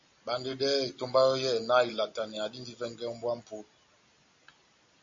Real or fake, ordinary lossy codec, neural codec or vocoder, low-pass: real; MP3, 48 kbps; none; 7.2 kHz